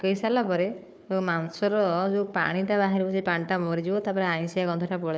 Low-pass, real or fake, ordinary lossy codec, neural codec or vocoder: none; fake; none; codec, 16 kHz, 4 kbps, FunCodec, trained on Chinese and English, 50 frames a second